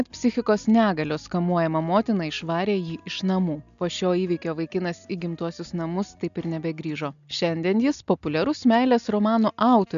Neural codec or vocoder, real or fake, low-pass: none; real; 7.2 kHz